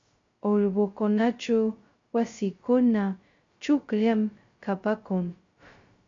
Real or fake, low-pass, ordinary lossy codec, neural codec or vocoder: fake; 7.2 kHz; MP3, 48 kbps; codec, 16 kHz, 0.2 kbps, FocalCodec